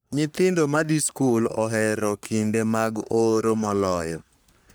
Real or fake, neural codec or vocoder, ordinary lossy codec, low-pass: fake; codec, 44.1 kHz, 3.4 kbps, Pupu-Codec; none; none